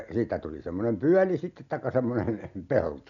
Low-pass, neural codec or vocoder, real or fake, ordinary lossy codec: 7.2 kHz; none; real; none